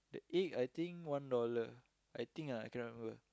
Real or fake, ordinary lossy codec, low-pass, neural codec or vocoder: real; none; none; none